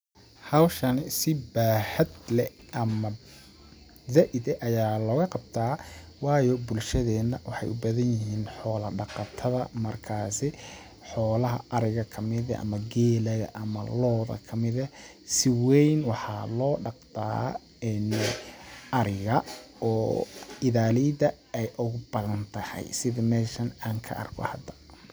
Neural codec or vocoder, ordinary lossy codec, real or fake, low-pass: none; none; real; none